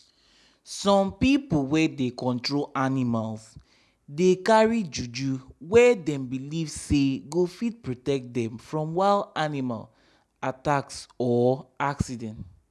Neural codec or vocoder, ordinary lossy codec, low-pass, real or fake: none; none; none; real